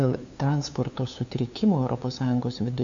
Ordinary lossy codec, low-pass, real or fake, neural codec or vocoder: MP3, 64 kbps; 7.2 kHz; fake; codec, 16 kHz, 2 kbps, FunCodec, trained on LibriTTS, 25 frames a second